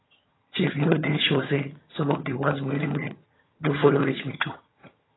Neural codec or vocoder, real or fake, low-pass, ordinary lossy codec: vocoder, 22.05 kHz, 80 mel bands, HiFi-GAN; fake; 7.2 kHz; AAC, 16 kbps